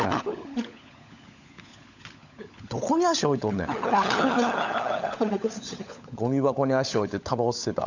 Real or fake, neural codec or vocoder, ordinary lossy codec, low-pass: fake; codec, 16 kHz, 16 kbps, FunCodec, trained on LibriTTS, 50 frames a second; none; 7.2 kHz